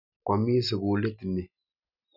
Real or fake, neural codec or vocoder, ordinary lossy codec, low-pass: real; none; none; 5.4 kHz